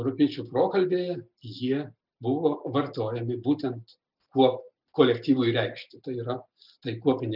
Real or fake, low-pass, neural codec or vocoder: real; 5.4 kHz; none